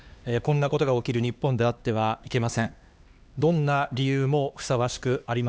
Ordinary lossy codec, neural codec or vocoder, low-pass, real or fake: none; codec, 16 kHz, 2 kbps, X-Codec, HuBERT features, trained on LibriSpeech; none; fake